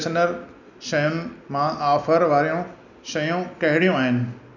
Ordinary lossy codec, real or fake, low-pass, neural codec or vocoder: none; real; 7.2 kHz; none